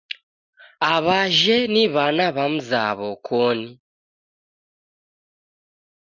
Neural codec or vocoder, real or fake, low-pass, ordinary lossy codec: none; real; 7.2 kHz; Opus, 64 kbps